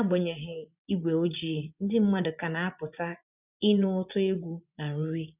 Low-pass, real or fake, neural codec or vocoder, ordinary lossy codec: 3.6 kHz; fake; vocoder, 24 kHz, 100 mel bands, Vocos; none